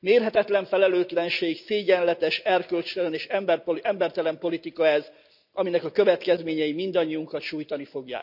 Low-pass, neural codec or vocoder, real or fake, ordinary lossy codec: 5.4 kHz; none; real; none